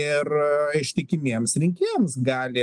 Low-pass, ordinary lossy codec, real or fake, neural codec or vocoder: 10.8 kHz; Opus, 24 kbps; real; none